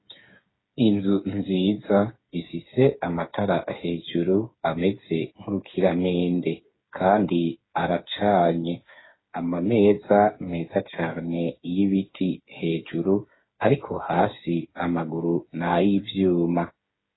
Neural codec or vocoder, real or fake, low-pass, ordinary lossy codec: codec, 16 kHz, 8 kbps, FreqCodec, smaller model; fake; 7.2 kHz; AAC, 16 kbps